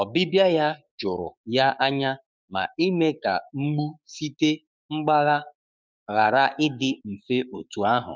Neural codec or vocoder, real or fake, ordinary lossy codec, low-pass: codec, 16 kHz, 6 kbps, DAC; fake; none; none